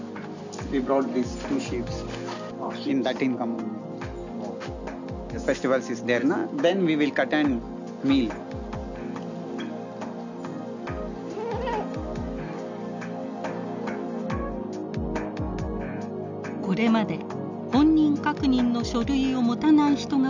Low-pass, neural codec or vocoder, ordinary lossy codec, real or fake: 7.2 kHz; none; none; real